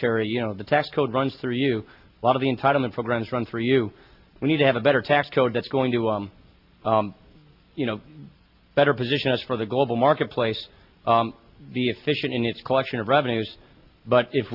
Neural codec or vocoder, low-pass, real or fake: none; 5.4 kHz; real